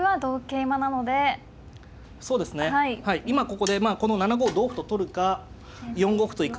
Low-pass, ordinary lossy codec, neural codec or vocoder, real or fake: none; none; none; real